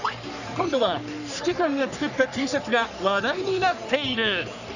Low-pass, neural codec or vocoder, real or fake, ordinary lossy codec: 7.2 kHz; codec, 44.1 kHz, 3.4 kbps, Pupu-Codec; fake; none